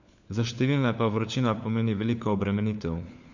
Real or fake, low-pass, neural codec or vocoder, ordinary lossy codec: fake; 7.2 kHz; codec, 16 kHz, 4 kbps, FunCodec, trained on LibriTTS, 50 frames a second; none